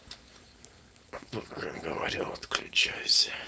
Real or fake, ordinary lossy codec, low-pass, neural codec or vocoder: fake; none; none; codec, 16 kHz, 4.8 kbps, FACodec